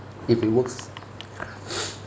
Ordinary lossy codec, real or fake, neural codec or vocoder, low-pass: none; real; none; none